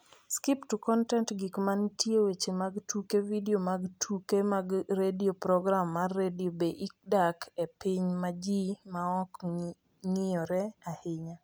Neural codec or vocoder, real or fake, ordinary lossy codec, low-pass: none; real; none; none